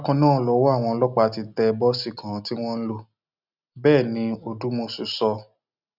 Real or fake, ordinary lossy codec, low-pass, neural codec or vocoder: real; none; 5.4 kHz; none